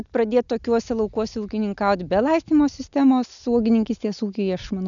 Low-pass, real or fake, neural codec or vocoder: 7.2 kHz; real; none